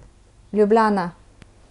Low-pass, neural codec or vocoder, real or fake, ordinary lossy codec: 10.8 kHz; none; real; none